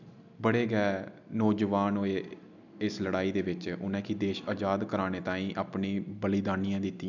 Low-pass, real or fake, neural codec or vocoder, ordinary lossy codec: 7.2 kHz; real; none; none